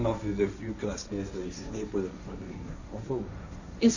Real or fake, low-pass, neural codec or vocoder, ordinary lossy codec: fake; 7.2 kHz; codec, 16 kHz, 1.1 kbps, Voila-Tokenizer; none